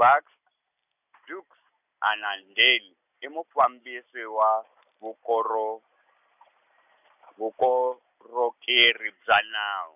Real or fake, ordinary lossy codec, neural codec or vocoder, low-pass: real; none; none; 3.6 kHz